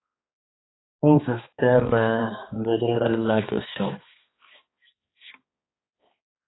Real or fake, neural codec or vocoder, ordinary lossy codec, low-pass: fake; codec, 16 kHz, 2 kbps, X-Codec, HuBERT features, trained on balanced general audio; AAC, 16 kbps; 7.2 kHz